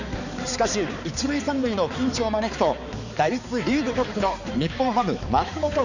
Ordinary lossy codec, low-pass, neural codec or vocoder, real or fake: none; 7.2 kHz; codec, 16 kHz, 4 kbps, X-Codec, HuBERT features, trained on balanced general audio; fake